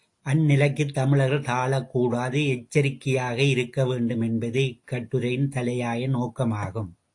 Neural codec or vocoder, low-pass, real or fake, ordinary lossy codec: none; 10.8 kHz; real; AAC, 48 kbps